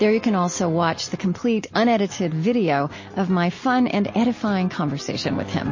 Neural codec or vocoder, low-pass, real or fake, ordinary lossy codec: none; 7.2 kHz; real; MP3, 32 kbps